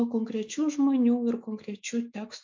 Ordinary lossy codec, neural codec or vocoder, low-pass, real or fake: MP3, 48 kbps; autoencoder, 48 kHz, 128 numbers a frame, DAC-VAE, trained on Japanese speech; 7.2 kHz; fake